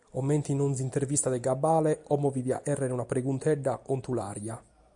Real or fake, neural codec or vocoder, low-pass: real; none; 10.8 kHz